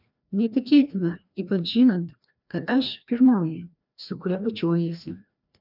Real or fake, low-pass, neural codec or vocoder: fake; 5.4 kHz; codec, 16 kHz, 1 kbps, FreqCodec, larger model